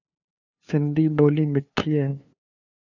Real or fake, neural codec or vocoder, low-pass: fake; codec, 16 kHz, 2 kbps, FunCodec, trained on LibriTTS, 25 frames a second; 7.2 kHz